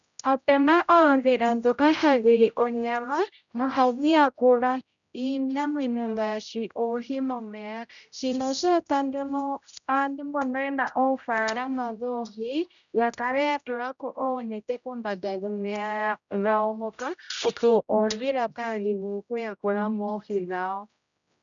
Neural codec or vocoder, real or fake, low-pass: codec, 16 kHz, 0.5 kbps, X-Codec, HuBERT features, trained on general audio; fake; 7.2 kHz